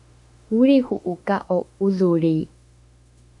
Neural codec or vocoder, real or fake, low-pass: autoencoder, 48 kHz, 32 numbers a frame, DAC-VAE, trained on Japanese speech; fake; 10.8 kHz